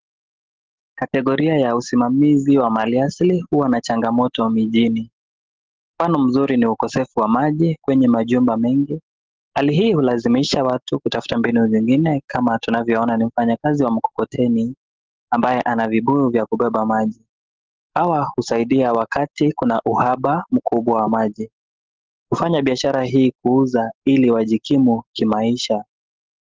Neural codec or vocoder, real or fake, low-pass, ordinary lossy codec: none; real; 7.2 kHz; Opus, 16 kbps